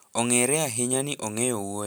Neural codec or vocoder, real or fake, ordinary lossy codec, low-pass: none; real; none; none